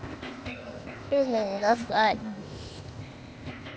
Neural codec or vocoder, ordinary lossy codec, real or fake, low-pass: codec, 16 kHz, 0.8 kbps, ZipCodec; none; fake; none